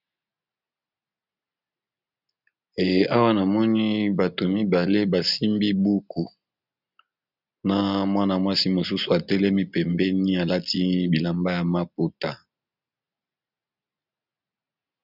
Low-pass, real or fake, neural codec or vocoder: 5.4 kHz; real; none